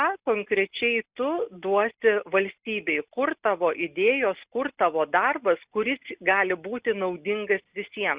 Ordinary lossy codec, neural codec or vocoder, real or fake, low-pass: Opus, 64 kbps; none; real; 3.6 kHz